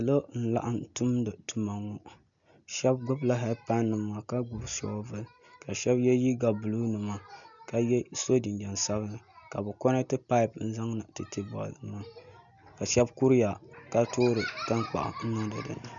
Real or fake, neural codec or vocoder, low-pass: real; none; 7.2 kHz